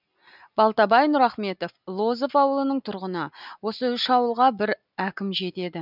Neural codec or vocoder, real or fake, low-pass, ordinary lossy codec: none; real; 5.4 kHz; none